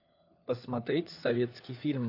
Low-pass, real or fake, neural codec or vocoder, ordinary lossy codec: 5.4 kHz; fake; codec, 16 kHz, 4 kbps, FunCodec, trained on LibriTTS, 50 frames a second; AAC, 32 kbps